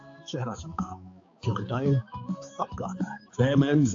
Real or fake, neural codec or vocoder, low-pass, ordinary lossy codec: fake; codec, 16 kHz, 4 kbps, X-Codec, HuBERT features, trained on balanced general audio; 7.2 kHz; AAC, 48 kbps